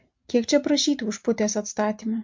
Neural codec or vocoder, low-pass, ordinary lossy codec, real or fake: none; 7.2 kHz; MP3, 48 kbps; real